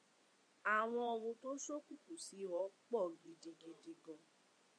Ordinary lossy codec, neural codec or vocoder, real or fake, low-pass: AAC, 48 kbps; vocoder, 24 kHz, 100 mel bands, Vocos; fake; 9.9 kHz